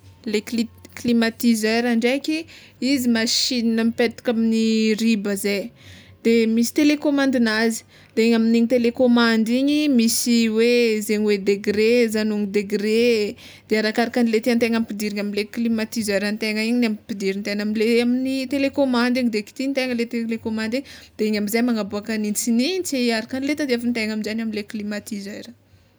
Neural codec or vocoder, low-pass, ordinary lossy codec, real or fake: none; none; none; real